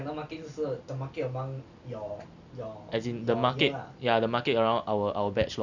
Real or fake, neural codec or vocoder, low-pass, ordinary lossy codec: real; none; 7.2 kHz; none